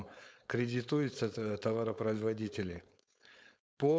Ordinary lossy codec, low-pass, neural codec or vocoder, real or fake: none; none; codec, 16 kHz, 4.8 kbps, FACodec; fake